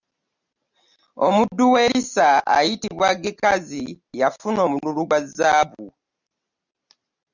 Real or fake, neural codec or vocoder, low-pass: real; none; 7.2 kHz